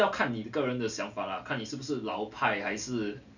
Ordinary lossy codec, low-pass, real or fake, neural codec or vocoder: none; 7.2 kHz; real; none